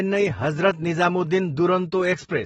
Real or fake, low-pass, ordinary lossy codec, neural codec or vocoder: real; 19.8 kHz; AAC, 24 kbps; none